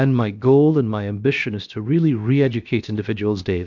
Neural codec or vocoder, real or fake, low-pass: codec, 16 kHz, about 1 kbps, DyCAST, with the encoder's durations; fake; 7.2 kHz